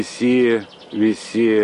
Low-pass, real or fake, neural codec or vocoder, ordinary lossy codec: 10.8 kHz; real; none; MP3, 48 kbps